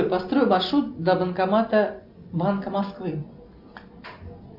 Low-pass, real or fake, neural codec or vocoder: 5.4 kHz; real; none